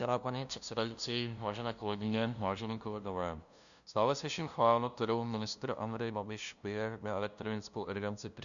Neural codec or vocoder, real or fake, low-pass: codec, 16 kHz, 0.5 kbps, FunCodec, trained on LibriTTS, 25 frames a second; fake; 7.2 kHz